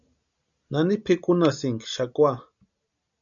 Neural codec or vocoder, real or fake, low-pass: none; real; 7.2 kHz